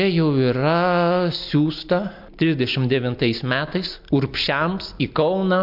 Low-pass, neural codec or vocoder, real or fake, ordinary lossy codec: 5.4 kHz; none; real; MP3, 48 kbps